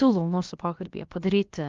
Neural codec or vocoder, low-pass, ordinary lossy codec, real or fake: codec, 16 kHz, about 1 kbps, DyCAST, with the encoder's durations; 7.2 kHz; Opus, 32 kbps; fake